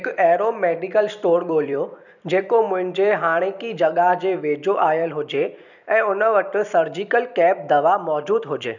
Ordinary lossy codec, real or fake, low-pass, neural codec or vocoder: none; fake; 7.2 kHz; vocoder, 44.1 kHz, 128 mel bands every 256 samples, BigVGAN v2